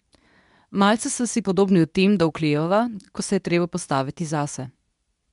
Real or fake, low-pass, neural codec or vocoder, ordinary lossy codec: fake; 10.8 kHz; codec, 24 kHz, 0.9 kbps, WavTokenizer, medium speech release version 2; MP3, 96 kbps